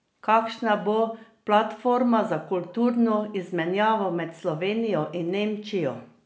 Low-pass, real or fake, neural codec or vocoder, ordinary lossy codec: none; real; none; none